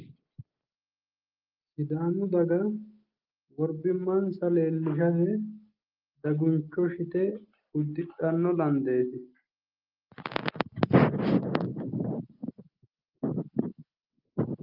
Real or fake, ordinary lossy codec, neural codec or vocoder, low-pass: real; Opus, 24 kbps; none; 5.4 kHz